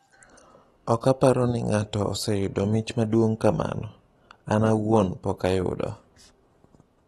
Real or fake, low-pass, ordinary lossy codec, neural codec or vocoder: real; 19.8 kHz; AAC, 32 kbps; none